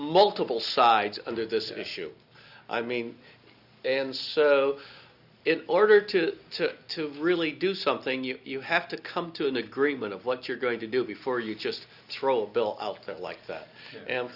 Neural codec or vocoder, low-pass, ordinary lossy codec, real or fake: none; 5.4 kHz; Opus, 64 kbps; real